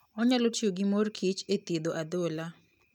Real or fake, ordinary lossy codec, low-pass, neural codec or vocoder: real; none; 19.8 kHz; none